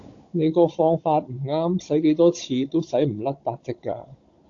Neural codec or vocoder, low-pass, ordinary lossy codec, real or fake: codec, 16 kHz, 16 kbps, FunCodec, trained on Chinese and English, 50 frames a second; 7.2 kHz; AAC, 48 kbps; fake